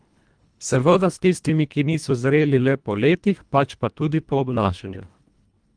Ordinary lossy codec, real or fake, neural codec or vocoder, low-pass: Opus, 32 kbps; fake; codec, 24 kHz, 1.5 kbps, HILCodec; 9.9 kHz